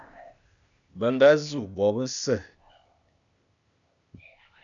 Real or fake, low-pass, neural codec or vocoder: fake; 7.2 kHz; codec, 16 kHz, 0.8 kbps, ZipCodec